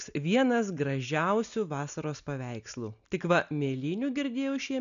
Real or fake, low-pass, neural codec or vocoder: real; 7.2 kHz; none